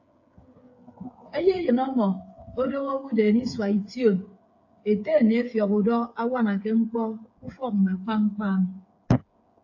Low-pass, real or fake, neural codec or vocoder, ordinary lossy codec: 7.2 kHz; fake; codec, 16 kHz, 8 kbps, FreqCodec, smaller model; AAC, 48 kbps